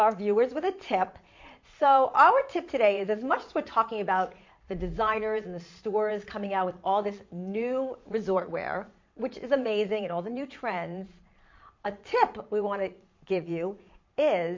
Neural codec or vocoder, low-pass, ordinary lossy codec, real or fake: vocoder, 22.05 kHz, 80 mel bands, Vocos; 7.2 kHz; MP3, 48 kbps; fake